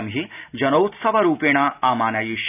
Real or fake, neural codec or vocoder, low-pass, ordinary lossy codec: real; none; 3.6 kHz; none